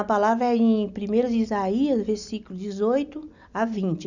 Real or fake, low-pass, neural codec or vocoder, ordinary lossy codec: real; 7.2 kHz; none; none